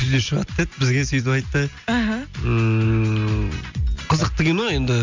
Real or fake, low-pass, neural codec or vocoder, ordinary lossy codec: real; 7.2 kHz; none; MP3, 64 kbps